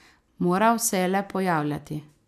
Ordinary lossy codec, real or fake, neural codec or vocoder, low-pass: none; real; none; 14.4 kHz